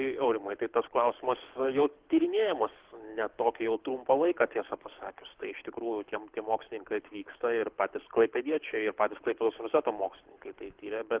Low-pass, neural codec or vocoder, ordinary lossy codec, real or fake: 3.6 kHz; codec, 24 kHz, 6 kbps, HILCodec; Opus, 16 kbps; fake